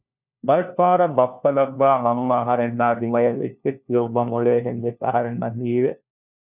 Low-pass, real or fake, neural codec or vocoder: 3.6 kHz; fake; codec, 16 kHz, 1 kbps, FunCodec, trained on LibriTTS, 50 frames a second